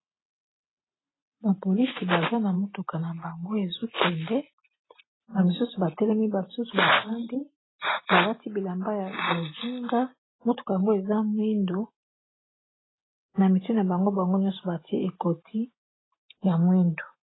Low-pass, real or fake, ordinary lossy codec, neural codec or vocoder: 7.2 kHz; real; AAC, 16 kbps; none